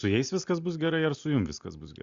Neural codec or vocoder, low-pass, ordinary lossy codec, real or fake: none; 7.2 kHz; Opus, 64 kbps; real